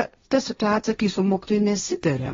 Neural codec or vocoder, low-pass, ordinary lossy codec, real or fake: codec, 16 kHz, 1.1 kbps, Voila-Tokenizer; 7.2 kHz; AAC, 24 kbps; fake